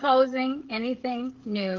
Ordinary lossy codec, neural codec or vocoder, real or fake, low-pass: Opus, 16 kbps; codec, 16 kHz, 16 kbps, FreqCodec, smaller model; fake; 7.2 kHz